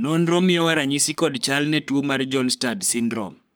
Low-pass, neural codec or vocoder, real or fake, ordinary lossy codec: none; codec, 44.1 kHz, 3.4 kbps, Pupu-Codec; fake; none